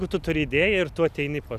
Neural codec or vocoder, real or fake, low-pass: none; real; 14.4 kHz